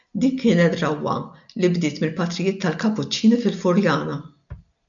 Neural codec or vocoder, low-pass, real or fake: none; 7.2 kHz; real